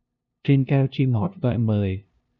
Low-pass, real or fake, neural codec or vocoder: 7.2 kHz; fake; codec, 16 kHz, 0.5 kbps, FunCodec, trained on LibriTTS, 25 frames a second